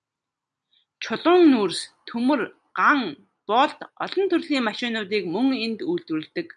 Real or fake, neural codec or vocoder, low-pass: real; none; 10.8 kHz